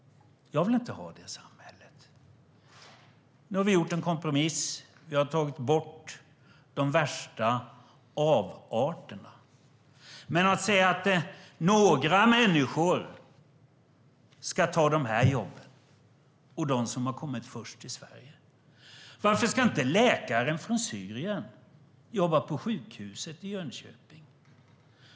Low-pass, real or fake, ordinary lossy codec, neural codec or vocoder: none; real; none; none